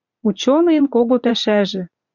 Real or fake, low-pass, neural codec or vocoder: fake; 7.2 kHz; vocoder, 44.1 kHz, 80 mel bands, Vocos